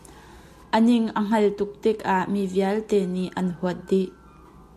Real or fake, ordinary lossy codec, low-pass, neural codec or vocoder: real; MP3, 64 kbps; 14.4 kHz; none